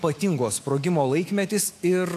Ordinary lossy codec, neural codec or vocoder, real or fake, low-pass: AAC, 64 kbps; autoencoder, 48 kHz, 128 numbers a frame, DAC-VAE, trained on Japanese speech; fake; 14.4 kHz